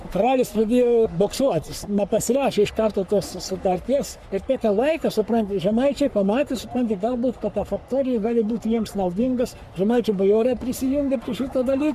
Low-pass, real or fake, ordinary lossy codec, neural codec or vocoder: 14.4 kHz; fake; MP3, 96 kbps; codec, 44.1 kHz, 3.4 kbps, Pupu-Codec